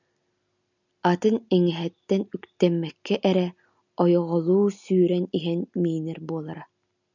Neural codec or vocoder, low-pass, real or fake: none; 7.2 kHz; real